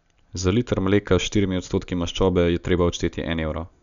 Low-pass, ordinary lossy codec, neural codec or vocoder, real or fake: 7.2 kHz; none; none; real